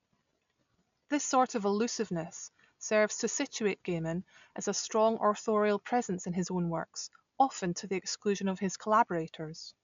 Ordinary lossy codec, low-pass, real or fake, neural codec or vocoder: MP3, 64 kbps; 7.2 kHz; real; none